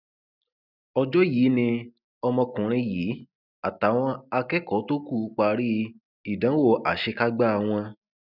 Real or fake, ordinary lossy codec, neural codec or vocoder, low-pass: real; none; none; 5.4 kHz